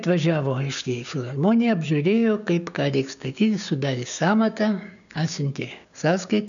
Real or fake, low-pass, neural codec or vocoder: fake; 7.2 kHz; codec, 16 kHz, 6 kbps, DAC